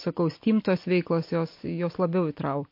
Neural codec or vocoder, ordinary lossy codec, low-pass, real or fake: none; MP3, 32 kbps; 5.4 kHz; real